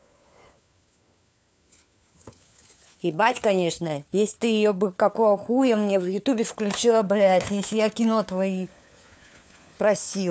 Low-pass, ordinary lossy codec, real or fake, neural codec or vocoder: none; none; fake; codec, 16 kHz, 4 kbps, FunCodec, trained on LibriTTS, 50 frames a second